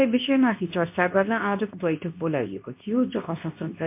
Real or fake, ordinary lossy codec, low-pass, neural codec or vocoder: fake; MP3, 32 kbps; 3.6 kHz; codec, 24 kHz, 0.9 kbps, WavTokenizer, medium speech release version 2